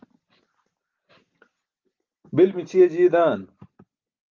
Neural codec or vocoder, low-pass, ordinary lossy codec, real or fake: none; 7.2 kHz; Opus, 24 kbps; real